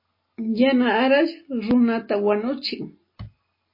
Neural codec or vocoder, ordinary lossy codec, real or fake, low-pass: none; MP3, 24 kbps; real; 5.4 kHz